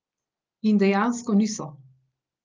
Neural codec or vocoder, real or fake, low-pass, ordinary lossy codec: none; real; 7.2 kHz; Opus, 32 kbps